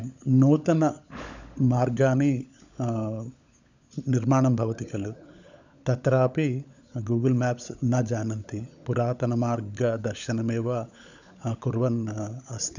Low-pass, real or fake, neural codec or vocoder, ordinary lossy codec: 7.2 kHz; fake; codec, 16 kHz, 16 kbps, FunCodec, trained on LibriTTS, 50 frames a second; none